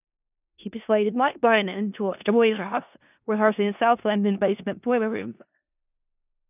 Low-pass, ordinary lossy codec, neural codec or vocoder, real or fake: 3.6 kHz; none; codec, 16 kHz in and 24 kHz out, 0.4 kbps, LongCat-Audio-Codec, four codebook decoder; fake